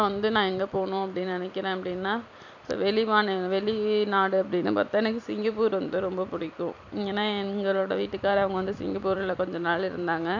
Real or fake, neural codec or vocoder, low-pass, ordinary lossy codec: fake; vocoder, 44.1 kHz, 128 mel bands every 256 samples, BigVGAN v2; 7.2 kHz; none